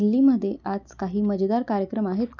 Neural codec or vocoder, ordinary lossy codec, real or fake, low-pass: none; none; real; 7.2 kHz